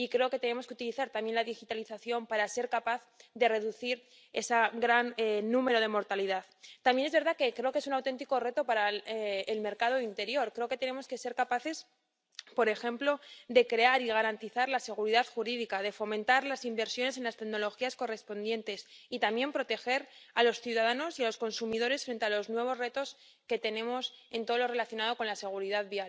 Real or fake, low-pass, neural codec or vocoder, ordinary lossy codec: real; none; none; none